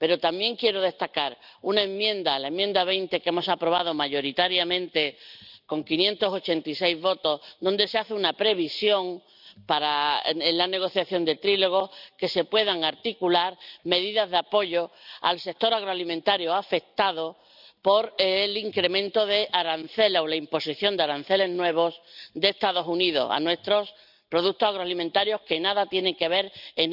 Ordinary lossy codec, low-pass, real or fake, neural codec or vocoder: none; 5.4 kHz; real; none